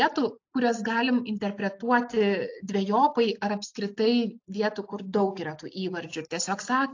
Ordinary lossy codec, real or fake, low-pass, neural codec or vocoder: AAC, 48 kbps; real; 7.2 kHz; none